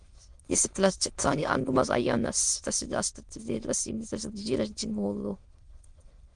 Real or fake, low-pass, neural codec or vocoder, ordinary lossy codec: fake; 9.9 kHz; autoencoder, 22.05 kHz, a latent of 192 numbers a frame, VITS, trained on many speakers; Opus, 24 kbps